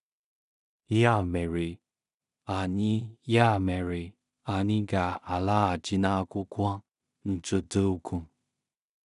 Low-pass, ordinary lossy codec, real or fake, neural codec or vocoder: 10.8 kHz; none; fake; codec, 16 kHz in and 24 kHz out, 0.4 kbps, LongCat-Audio-Codec, two codebook decoder